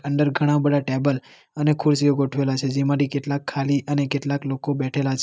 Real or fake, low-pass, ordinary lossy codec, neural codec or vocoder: real; none; none; none